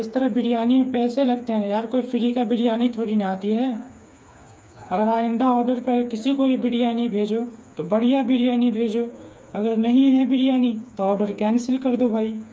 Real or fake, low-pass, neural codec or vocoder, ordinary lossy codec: fake; none; codec, 16 kHz, 4 kbps, FreqCodec, smaller model; none